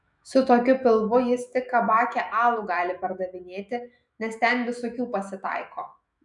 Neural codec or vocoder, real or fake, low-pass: autoencoder, 48 kHz, 128 numbers a frame, DAC-VAE, trained on Japanese speech; fake; 10.8 kHz